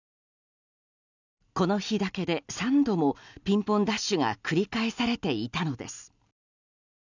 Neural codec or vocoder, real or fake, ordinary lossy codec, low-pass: none; real; none; 7.2 kHz